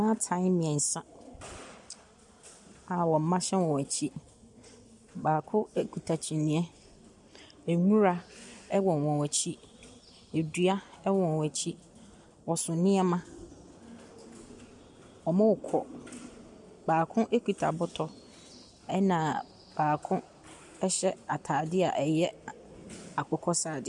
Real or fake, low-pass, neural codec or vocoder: real; 10.8 kHz; none